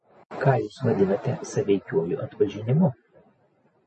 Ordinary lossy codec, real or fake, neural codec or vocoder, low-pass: MP3, 32 kbps; real; none; 10.8 kHz